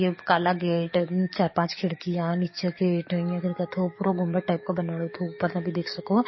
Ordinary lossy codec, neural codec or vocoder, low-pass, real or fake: MP3, 24 kbps; codec, 44.1 kHz, 7.8 kbps, DAC; 7.2 kHz; fake